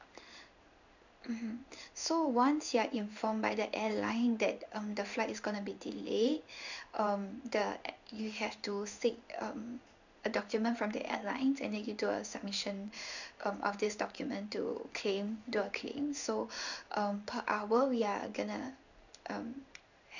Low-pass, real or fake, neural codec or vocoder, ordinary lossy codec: 7.2 kHz; fake; codec, 16 kHz in and 24 kHz out, 1 kbps, XY-Tokenizer; none